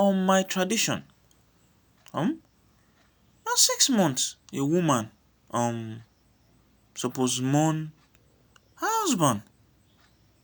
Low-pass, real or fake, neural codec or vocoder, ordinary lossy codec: none; real; none; none